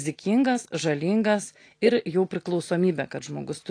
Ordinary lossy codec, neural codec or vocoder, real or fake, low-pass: AAC, 48 kbps; none; real; 9.9 kHz